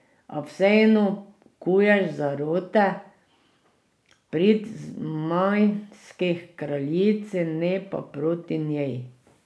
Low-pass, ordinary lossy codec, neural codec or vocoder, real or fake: none; none; none; real